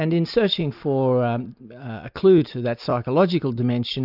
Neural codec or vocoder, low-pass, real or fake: none; 5.4 kHz; real